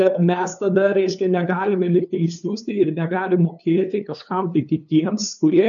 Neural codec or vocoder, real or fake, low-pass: codec, 16 kHz, 2 kbps, FunCodec, trained on LibriTTS, 25 frames a second; fake; 7.2 kHz